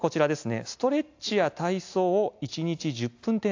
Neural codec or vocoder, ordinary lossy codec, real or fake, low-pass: none; AAC, 48 kbps; real; 7.2 kHz